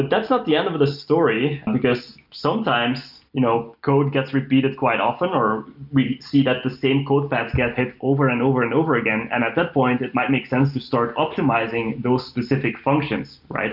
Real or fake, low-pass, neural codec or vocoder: real; 5.4 kHz; none